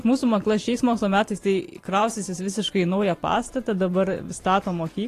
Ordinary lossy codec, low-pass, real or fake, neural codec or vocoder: AAC, 48 kbps; 14.4 kHz; fake; vocoder, 44.1 kHz, 128 mel bands every 512 samples, BigVGAN v2